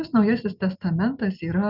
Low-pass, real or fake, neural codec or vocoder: 5.4 kHz; real; none